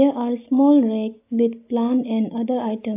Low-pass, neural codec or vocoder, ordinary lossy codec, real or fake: 3.6 kHz; none; AAC, 24 kbps; real